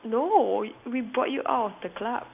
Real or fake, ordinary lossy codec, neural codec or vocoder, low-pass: real; AAC, 32 kbps; none; 3.6 kHz